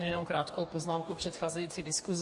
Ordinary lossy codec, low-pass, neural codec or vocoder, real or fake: MP3, 48 kbps; 10.8 kHz; codec, 44.1 kHz, 2.6 kbps, DAC; fake